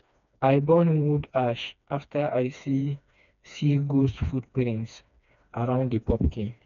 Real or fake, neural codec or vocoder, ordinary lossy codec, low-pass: fake; codec, 16 kHz, 2 kbps, FreqCodec, smaller model; none; 7.2 kHz